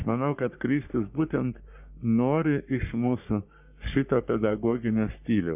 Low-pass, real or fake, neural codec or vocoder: 3.6 kHz; fake; codec, 44.1 kHz, 3.4 kbps, Pupu-Codec